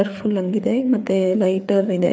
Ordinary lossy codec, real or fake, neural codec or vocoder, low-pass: none; fake; codec, 16 kHz, 4 kbps, FreqCodec, larger model; none